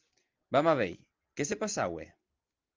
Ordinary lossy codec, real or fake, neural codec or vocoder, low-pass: Opus, 24 kbps; real; none; 7.2 kHz